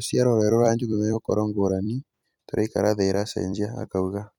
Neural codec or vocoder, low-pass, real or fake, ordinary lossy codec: vocoder, 44.1 kHz, 128 mel bands every 256 samples, BigVGAN v2; 19.8 kHz; fake; none